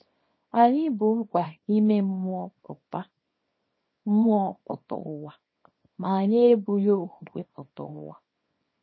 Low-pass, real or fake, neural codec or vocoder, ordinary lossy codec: 7.2 kHz; fake; codec, 24 kHz, 0.9 kbps, WavTokenizer, small release; MP3, 24 kbps